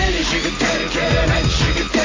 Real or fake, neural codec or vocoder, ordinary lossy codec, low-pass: real; none; AAC, 32 kbps; 7.2 kHz